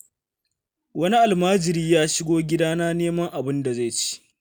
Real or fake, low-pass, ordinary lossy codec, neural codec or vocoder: real; none; none; none